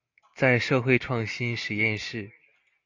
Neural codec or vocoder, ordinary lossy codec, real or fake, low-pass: none; AAC, 48 kbps; real; 7.2 kHz